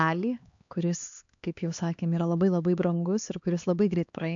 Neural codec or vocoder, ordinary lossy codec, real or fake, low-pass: codec, 16 kHz, 2 kbps, X-Codec, HuBERT features, trained on LibriSpeech; AAC, 64 kbps; fake; 7.2 kHz